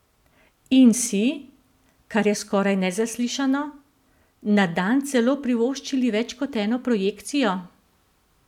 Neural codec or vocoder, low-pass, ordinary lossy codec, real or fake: none; 19.8 kHz; none; real